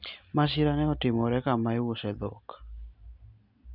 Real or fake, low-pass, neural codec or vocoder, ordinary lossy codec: real; 5.4 kHz; none; none